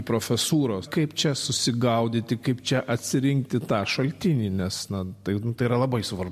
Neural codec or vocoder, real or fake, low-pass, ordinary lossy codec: none; real; 14.4 kHz; MP3, 64 kbps